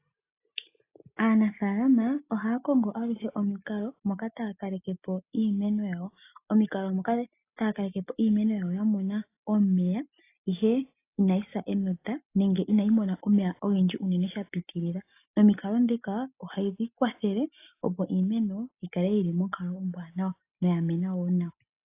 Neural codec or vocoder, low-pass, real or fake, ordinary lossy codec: none; 3.6 kHz; real; AAC, 24 kbps